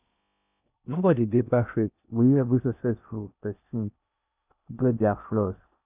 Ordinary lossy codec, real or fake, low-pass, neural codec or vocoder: none; fake; 3.6 kHz; codec, 16 kHz in and 24 kHz out, 0.6 kbps, FocalCodec, streaming, 4096 codes